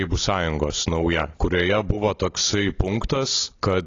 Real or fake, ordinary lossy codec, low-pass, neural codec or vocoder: real; AAC, 32 kbps; 7.2 kHz; none